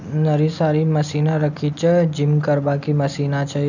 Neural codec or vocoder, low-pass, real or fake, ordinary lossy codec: none; 7.2 kHz; real; none